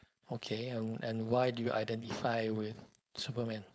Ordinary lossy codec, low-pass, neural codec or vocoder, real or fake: none; none; codec, 16 kHz, 4.8 kbps, FACodec; fake